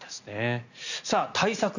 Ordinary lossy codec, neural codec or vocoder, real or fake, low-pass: none; none; real; 7.2 kHz